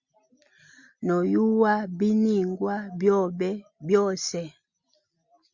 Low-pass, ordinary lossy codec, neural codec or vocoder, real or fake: 7.2 kHz; Opus, 64 kbps; none; real